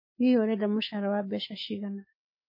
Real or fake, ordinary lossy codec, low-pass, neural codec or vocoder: fake; MP3, 24 kbps; 5.4 kHz; autoencoder, 48 kHz, 128 numbers a frame, DAC-VAE, trained on Japanese speech